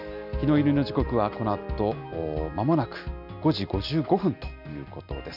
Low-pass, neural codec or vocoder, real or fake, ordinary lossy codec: 5.4 kHz; none; real; none